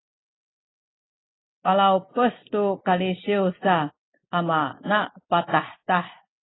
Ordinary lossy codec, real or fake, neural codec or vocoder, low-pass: AAC, 16 kbps; real; none; 7.2 kHz